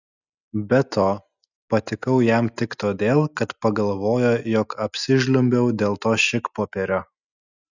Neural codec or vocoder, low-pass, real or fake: none; 7.2 kHz; real